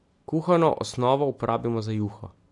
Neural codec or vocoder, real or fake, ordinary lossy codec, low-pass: none; real; AAC, 64 kbps; 10.8 kHz